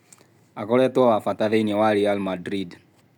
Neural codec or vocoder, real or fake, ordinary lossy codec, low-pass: none; real; none; 19.8 kHz